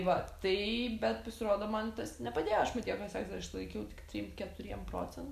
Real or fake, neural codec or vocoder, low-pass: real; none; 14.4 kHz